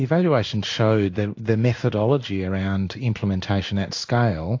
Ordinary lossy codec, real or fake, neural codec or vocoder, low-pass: AAC, 48 kbps; fake; codec, 16 kHz in and 24 kHz out, 1 kbps, XY-Tokenizer; 7.2 kHz